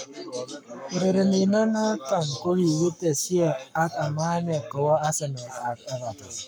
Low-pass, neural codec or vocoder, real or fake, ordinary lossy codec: none; codec, 44.1 kHz, 7.8 kbps, Pupu-Codec; fake; none